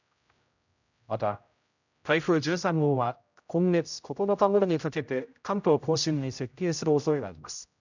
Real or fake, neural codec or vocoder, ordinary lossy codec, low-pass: fake; codec, 16 kHz, 0.5 kbps, X-Codec, HuBERT features, trained on general audio; none; 7.2 kHz